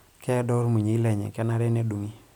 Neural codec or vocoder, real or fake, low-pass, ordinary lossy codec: none; real; 19.8 kHz; none